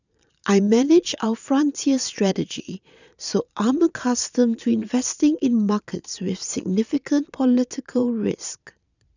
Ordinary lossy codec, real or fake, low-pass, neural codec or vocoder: none; fake; 7.2 kHz; vocoder, 22.05 kHz, 80 mel bands, Vocos